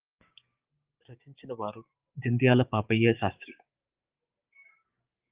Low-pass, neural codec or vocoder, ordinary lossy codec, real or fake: 3.6 kHz; codec, 16 kHz, 6 kbps, DAC; Opus, 32 kbps; fake